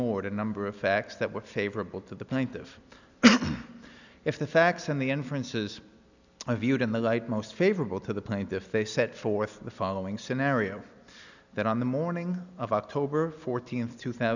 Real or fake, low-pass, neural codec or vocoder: real; 7.2 kHz; none